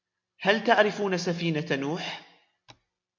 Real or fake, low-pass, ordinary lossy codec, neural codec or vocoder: real; 7.2 kHz; MP3, 64 kbps; none